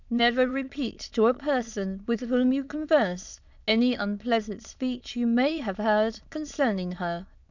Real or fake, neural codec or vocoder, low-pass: fake; autoencoder, 22.05 kHz, a latent of 192 numbers a frame, VITS, trained on many speakers; 7.2 kHz